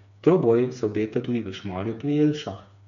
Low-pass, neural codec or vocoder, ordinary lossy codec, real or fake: 7.2 kHz; codec, 16 kHz, 4 kbps, FreqCodec, smaller model; none; fake